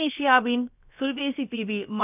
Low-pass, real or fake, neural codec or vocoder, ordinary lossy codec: 3.6 kHz; fake; codec, 16 kHz, about 1 kbps, DyCAST, with the encoder's durations; none